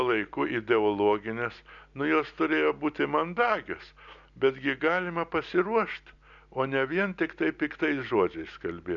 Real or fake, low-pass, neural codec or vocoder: real; 7.2 kHz; none